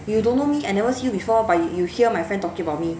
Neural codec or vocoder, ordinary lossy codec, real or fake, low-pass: none; none; real; none